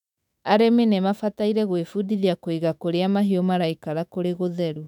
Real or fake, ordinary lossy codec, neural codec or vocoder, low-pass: fake; none; autoencoder, 48 kHz, 128 numbers a frame, DAC-VAE, trained on Japanese speech; 19.8 kHz